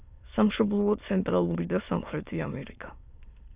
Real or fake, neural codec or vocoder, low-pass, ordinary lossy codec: fake; autoencoder, 22.05 kHz, a latent of 192 numbers a frame, VITS, trained on many speakers; 3.6 kHz; Opus, 64 kbps